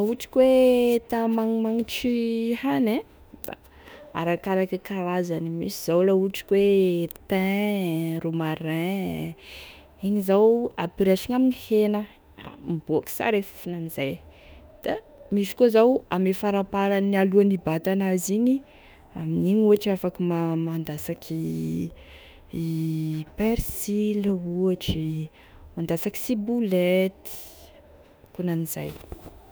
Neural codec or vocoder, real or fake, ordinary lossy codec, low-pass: autoencoder, 48 kHz, 32 numbers a frame, DAC-VAE, trained on Japanese speech; fake; none; none